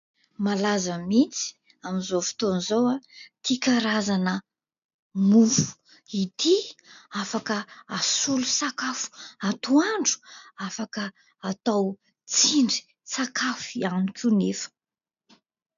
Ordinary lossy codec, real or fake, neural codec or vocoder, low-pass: AAC, 96 kbps; real; none; 7.2 kHz